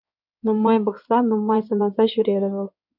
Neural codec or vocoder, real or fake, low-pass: codec, 16 kHz in and 24 kHz out, 2.2 kbps, FireRedTTS-2 codec; fake; 5.4 kHz